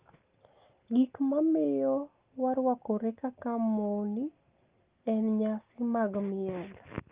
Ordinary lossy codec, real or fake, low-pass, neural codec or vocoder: Opus, 32 kbps; real; 3.6 kHz; none